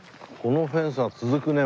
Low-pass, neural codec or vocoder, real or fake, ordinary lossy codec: none; none; real; none